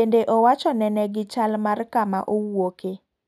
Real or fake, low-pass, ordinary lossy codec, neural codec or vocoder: real; 14.4 kHz; none; none